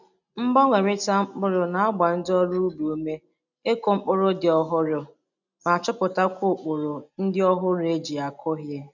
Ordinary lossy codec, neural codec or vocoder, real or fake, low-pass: none; none; real; 7.2 kHz